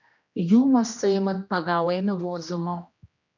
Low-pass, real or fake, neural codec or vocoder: 7.2 kHz; fake; codec, 16 kHz, 1 kbps, X-Codec, HuBERT features, trained on general audio